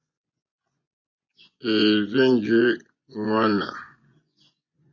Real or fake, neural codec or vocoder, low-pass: fake; vocoder, 24 kHz, 100 mel bands, Vocos; 7.2 kHz